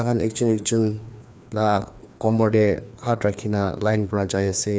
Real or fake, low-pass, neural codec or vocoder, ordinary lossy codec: fake; none; codec, 16 kHz, 2 kbps, FreqCodec, larger model; none